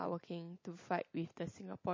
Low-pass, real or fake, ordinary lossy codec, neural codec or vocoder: 7.2 kHz; real; MP3, 32 kbps; none